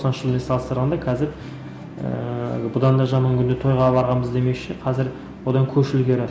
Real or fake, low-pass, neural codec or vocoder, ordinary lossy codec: real; none; none; none